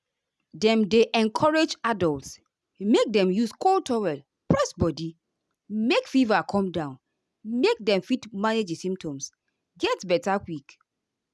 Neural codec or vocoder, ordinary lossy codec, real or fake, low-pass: none; none; real; none